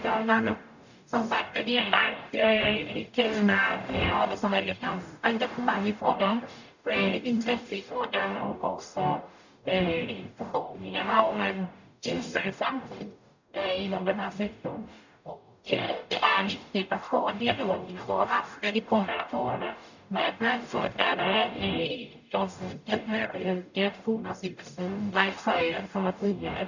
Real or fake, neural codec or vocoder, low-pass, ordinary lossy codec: fake; codec, 44.1 kHz, 0.9 kbps, DAC; 7.2 kHz; none